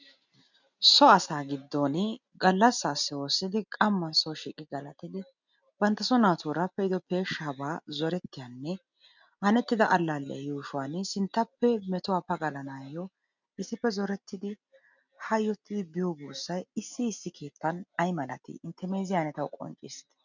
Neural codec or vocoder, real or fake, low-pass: none; real; 7.2 kHz